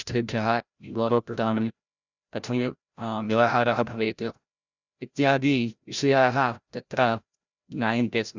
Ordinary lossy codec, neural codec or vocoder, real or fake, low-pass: Opus, 64 kbps; codec, 16 kHz, 0.5 kbps, FreqCodec, larger model; fake; 7.2 kHz